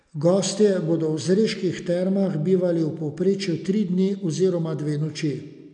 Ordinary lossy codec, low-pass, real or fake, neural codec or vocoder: none; 9.9 kHz; real; none